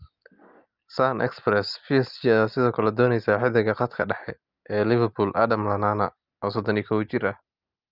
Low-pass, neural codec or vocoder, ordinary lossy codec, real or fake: 5.4 kHz; none; Opus, 24 kbps; real